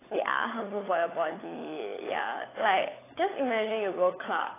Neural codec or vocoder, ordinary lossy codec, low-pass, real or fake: codec, 16 kHz, 16 kbps, FunCodec, trained on LibriTTS, 50 frames a second; AAC, 16 kbps; 3.6 kHz; fake